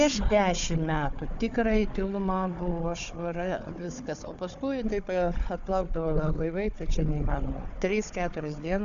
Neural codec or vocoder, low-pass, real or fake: codec, 16 kHz, 4 kbps, FunCodec, trained on Chinese and English, 50 frames a second; 7.2 kHz; fake